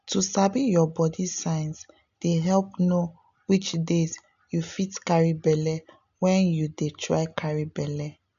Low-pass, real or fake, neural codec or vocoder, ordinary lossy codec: 7.2 kHz; real; none; none